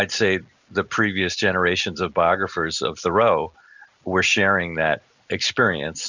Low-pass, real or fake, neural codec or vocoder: 7.2 kHz; real; none